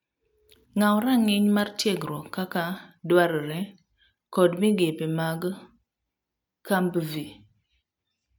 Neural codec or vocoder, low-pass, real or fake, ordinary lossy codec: none; 19.8 kHz; real; none